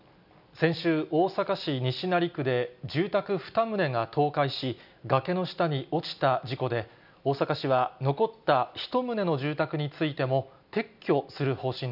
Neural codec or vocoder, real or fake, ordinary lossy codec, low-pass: none; real; none; 5.4 kHz